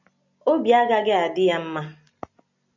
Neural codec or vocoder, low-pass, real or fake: none; 7.2 kHz; real